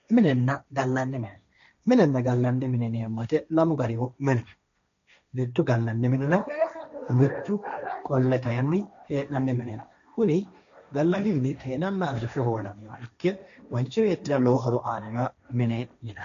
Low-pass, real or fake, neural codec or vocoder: 7.2 kHz; fake; codec, 16 kHz, 1.1 kbps, Voila-Tokenizer